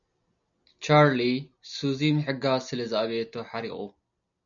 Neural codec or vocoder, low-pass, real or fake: none; 7.2 kHz; real